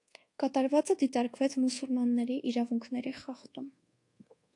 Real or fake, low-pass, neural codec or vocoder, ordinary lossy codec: fake; 10.8 kHz; codec, 24 kHz, 1.2 kbps, DualCodec; AAC, 48 kbps